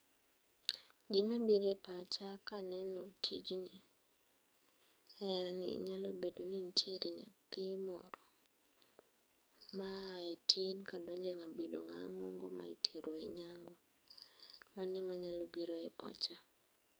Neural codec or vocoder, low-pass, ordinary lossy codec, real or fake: codec, 44.1 kHz, 2.6 kbps, SNAC; none; none; fake